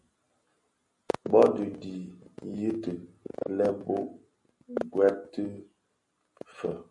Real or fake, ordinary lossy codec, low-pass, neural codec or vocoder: real; MP3, 48 kbps; 10.8 kHz; none